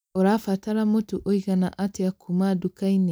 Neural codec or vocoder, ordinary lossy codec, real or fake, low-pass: none; none; real; none